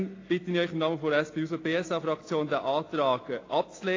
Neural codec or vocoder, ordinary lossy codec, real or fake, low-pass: none; AAC, 32 kbps; real; 7.2 kHz